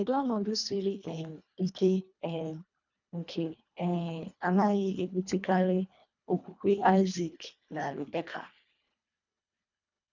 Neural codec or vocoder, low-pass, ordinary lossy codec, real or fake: codec, 24 kHz, 1.5 kbps, HILCodec; 7.2 kHz; none; fake